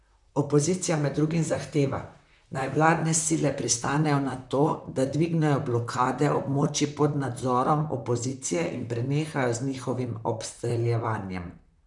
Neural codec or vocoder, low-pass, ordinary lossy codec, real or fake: vocoder, 44.1 kHz, 128 mel bands, Pupu-Vocoder; 10.8 kHz; none; fake